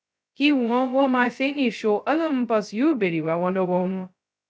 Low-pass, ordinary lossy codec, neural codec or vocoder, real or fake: none; none; codec, 16 kHz, 0.2 kbps, FocalCodec; fake